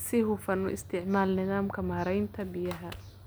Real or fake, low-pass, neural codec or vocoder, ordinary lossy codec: real; none; none; none